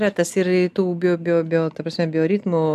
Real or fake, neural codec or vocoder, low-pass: real; none; 14.4 kHz